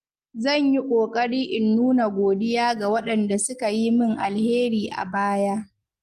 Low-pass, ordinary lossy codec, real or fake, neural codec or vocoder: 14.4 kHz; Opus, 24 kbps; real; none